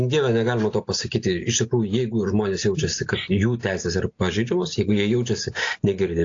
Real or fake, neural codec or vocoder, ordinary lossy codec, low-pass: real; none; AAC, 48 kbps; 7.2 kHz